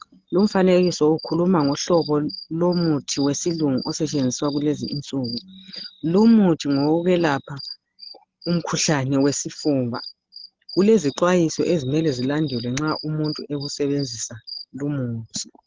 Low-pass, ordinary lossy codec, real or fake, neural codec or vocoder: 7.2 kHz; Opus, 16 kbps; real; none